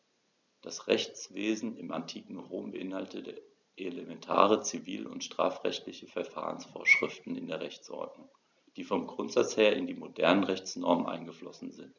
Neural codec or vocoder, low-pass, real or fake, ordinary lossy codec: none; 7.2 kHz; real; none